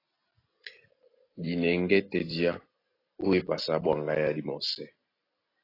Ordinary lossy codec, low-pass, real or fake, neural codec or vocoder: AAC, 24 kbps; 5.4 kHz; real; none